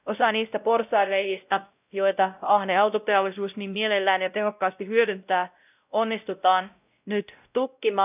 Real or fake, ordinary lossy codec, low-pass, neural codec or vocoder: fake; none; 3.6 kHz; codec, 16 kHz, 0.5 kbps, X-Codec, WavLM features, trained on Multilingual LibriSpeech